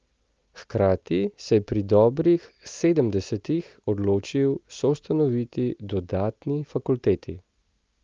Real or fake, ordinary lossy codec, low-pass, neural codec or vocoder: real; Opus, 32 kbps; 7.2 kHz; none